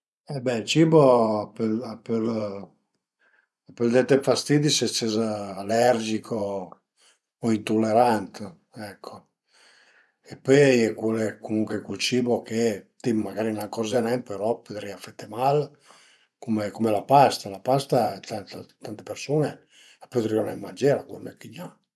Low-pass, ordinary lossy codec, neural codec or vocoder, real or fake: none; none; vocoder, 24 kHz, 100 mel bands, Vocos; fake